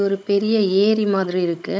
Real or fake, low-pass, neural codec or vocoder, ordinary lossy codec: fake; none; codec, 16 kHz, 16 kbps, FunCodec, trained on Chinese and English, 50 frames a second; none